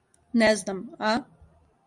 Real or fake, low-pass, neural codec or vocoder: real; 10.8 kHz; none